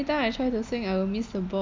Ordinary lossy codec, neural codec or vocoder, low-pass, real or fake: MP3, 64 kbps; none; 7.2 kHz; real